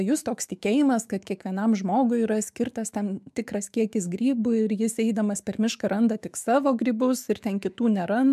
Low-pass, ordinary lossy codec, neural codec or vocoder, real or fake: 14.4 kHz; MP3, 96 kbps; autoencoder, 48 kHz, 128 numbers a frame, DAC-VAE, trained on Japanese speech; fake